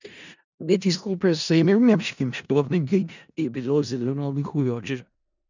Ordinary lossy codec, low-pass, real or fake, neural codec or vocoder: none; 7.2 kHz; fake; codec, 16 kHz in and 24 kHz out, 0.4 kbps, LongCat-Audio-Codec, four codebook decoder